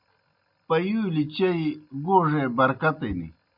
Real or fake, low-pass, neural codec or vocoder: real; 5.4 kHz; none